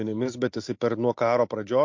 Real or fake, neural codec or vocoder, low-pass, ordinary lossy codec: real; none; 7.2 kHz; MP3, 48 kbps